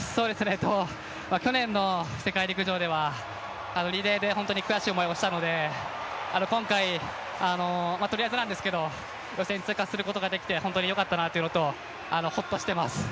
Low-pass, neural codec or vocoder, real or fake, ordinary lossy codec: none; none; real; none